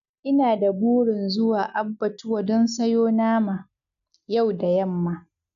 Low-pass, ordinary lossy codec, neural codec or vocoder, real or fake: 7.2 kHz; none; none; real